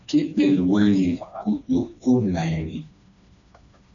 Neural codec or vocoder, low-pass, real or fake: codec, 16 kHz, 2 kbps, FreqCodec, smaller model; 7.2 kHz; fake